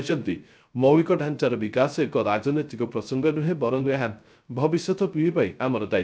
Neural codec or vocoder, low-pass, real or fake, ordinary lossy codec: codec, 16 kHz, 0.3 kbps, FocalCodec; none; fake; none